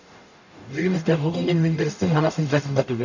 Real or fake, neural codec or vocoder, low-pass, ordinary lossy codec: fake; codec, 44.1 kHz, 0.9 kbps, DAC; 7.2 kHz; none